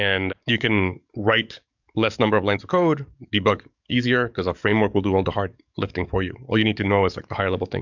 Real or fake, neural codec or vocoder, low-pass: fake; codec, 44.1 kHz, 7.8 kbps, DAC; 7.2 kHz